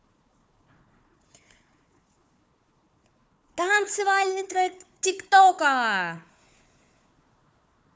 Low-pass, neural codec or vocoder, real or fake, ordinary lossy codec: none; codec, 16 kHz, 4 kbps, FunCodec, trained on Chinese and English, 50 frames a second; fake; none